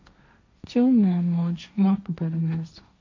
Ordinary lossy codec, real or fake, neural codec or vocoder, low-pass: MP3, 48 kbps; fake; codec, 16 kHz, 1.1 kbps, Voila-Tokenizer; 7.2 kHz